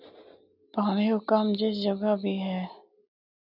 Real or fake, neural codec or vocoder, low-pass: real; none; 5.4 kHz